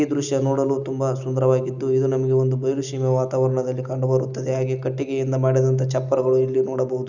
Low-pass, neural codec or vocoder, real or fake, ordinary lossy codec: 7.2 kHz; none; real; none